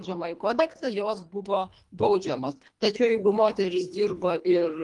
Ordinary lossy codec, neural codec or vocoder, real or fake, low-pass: Opus, 32 kbps; codec, 24 kHz, 1.5 kbps, HILCodec; fake; 10.8 kHz